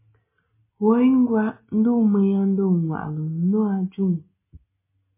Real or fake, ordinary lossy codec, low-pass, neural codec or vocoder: real; MP3, 16 kbps; 3.6 kHz; none